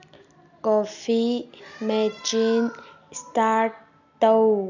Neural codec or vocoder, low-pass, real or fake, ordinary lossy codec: none; 7.2 kHz; real; none